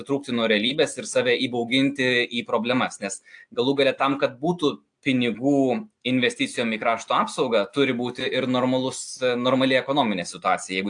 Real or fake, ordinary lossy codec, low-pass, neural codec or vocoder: real; AAC, 64 kbps; 9.9 kHz; none